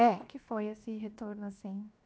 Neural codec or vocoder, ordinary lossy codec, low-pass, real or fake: codec, 16 kHz, 0.7 kbps, FocalCodec; none; none; fake